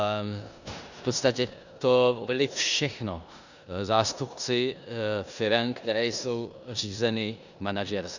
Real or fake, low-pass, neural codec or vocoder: fake; 7.2 kHz; codec, 16 kHz in and 24 kHz out, 0.9 kbps, LongCat-Audio-Codec, four codebook decoder